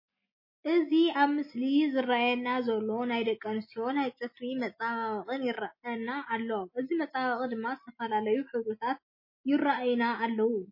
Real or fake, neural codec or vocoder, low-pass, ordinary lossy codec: real; none; 5.4 kHz; MP3, 24 kbps